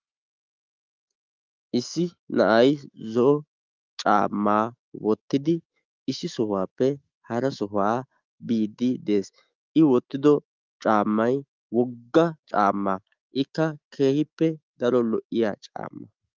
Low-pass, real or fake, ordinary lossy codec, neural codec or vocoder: 7.2 kHz; fake; Opus, 32 kbps; autoencoder, 48 kHz, 128 numbers a frame, DAC-VAE, trained on Japanese speech